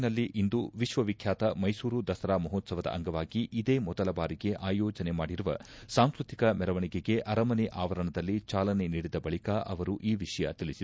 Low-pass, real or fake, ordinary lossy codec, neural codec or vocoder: none; real; none; none